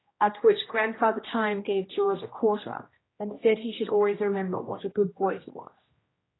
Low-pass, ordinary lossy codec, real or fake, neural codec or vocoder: 7.2 kHz; AAC, 16 kbps; fake; codec, 16 kHz, 1 kbps, X-Codec, HuBERT features, trained on general audio